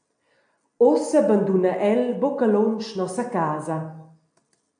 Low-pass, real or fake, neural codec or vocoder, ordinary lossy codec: 9.9 kHz; real; none; AAC, 64 kbps